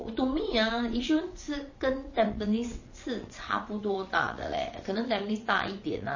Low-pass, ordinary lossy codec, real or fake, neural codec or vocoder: 7.2 kHz; MP3, 32 kbps; fake; vocoder, 22.05 kHz, 80 mel bands, WaveNeXt